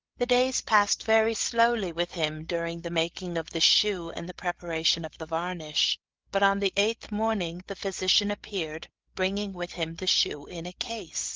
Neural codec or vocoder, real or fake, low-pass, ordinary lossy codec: codec, 16 kHz, 8 kbps, FreqCodec, larger model; fake; 7.2 kHz; Opus, 16 kbps